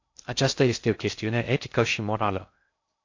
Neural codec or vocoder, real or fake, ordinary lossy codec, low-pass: codec, 16 kHz in and 24 kHz out, 0.6 kbps, FocalCodec, streaming, 2048 codes; fake; AAC, 48 kbps; 7.2 kHz